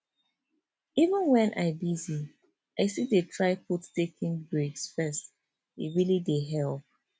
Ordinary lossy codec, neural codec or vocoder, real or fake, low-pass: none; none; real; none